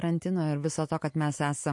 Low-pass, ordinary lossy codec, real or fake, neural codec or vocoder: 10.8 kHz; MP3, 48 kbps; real; none